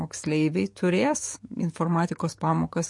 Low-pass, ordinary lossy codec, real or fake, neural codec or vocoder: 10.8 kHz; MP3, 48 kbps; fake; codec, 44.1 kHz, 7.8 kbps, DAC